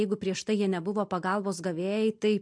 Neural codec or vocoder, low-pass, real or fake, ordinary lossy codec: none; 9.9 kHz; real; MP3, 64 kbps